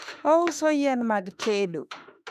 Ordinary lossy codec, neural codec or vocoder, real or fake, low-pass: none; autoencoder, 48 kHz, 32 numbers a frame, DAC-VAE, trained on Japanese speech; fake; 14.4 kHz